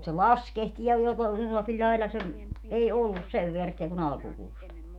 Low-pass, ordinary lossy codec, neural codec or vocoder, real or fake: 19.8 kHz; none; autoencoder, 48 kHz, 128 numbers a frame, DAC-VAE, trained on Japanese speech; fake